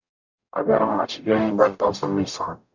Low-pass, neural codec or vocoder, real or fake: 7.2 kHz; codec, 44.1 kHz, 0.9 kbps, DAC; fake